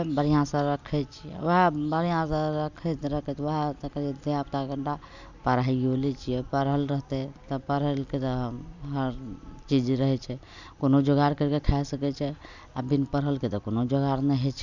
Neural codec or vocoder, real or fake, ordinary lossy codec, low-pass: none; real; none; 7.2 kHz